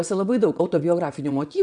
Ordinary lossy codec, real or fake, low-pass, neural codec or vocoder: MP3, 96 kbps; fake; 9.9 kHz; vocoder, 22.05 kHz, 80 mel bands, WaveNeXt